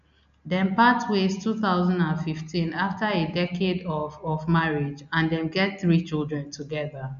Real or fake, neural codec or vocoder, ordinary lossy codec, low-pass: real; none; none; 7.2 kHz